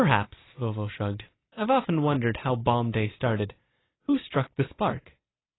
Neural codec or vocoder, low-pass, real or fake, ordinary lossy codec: none; 7.2 kHz; real; AAC, 16 kbps